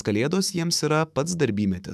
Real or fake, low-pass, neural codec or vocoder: fake; 14.4 kHz; autoencoder, 48 kHz, 128 numbers a frame, DAC-VAE, trained on Japanese speech